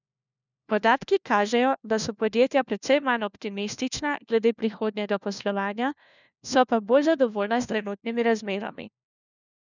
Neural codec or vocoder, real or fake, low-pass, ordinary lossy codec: codec, 16 kHz, 1 kbps, FunCodec, trained on LibriTTS, 50 frames a second; fake; 7.2 kHz; none